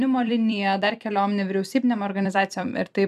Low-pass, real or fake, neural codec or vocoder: 14.4 kHz; fake; vocoder, 44.1 kHz, 128 mel bands every 256 samples, BigVGAN v2